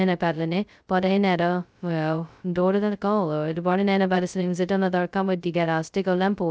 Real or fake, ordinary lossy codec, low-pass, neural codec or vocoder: fake; none; none; codec, 16 kHz, 0.2 kbps, FocalCodec